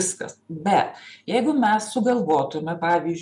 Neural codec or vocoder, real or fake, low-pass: none; real; 10.8 kHz